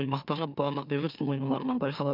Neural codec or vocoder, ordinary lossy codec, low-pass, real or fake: autoencoder, 44.1 kHz, a latent of 192 numbers a frame, MeloTTS; none; 5.4 kHz; fake